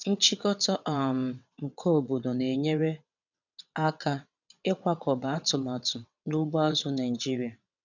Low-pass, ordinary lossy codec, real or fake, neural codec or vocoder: 7.2 kHz; none; fake; vocoder, 22.05 kHz, 80 mel bands, WaveNeXt